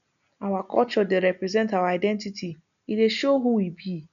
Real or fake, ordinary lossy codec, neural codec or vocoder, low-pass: real; none; none; 7.2 kHz